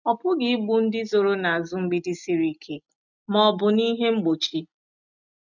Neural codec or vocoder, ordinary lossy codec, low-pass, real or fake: none; none; 7.2 kHz; real